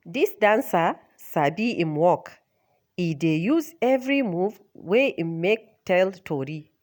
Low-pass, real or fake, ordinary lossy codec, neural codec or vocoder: none; real; none; none